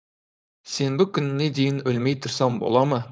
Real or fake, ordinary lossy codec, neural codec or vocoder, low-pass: fake; none; codec, 16 kHz, 4.8 kbps, FACodec; none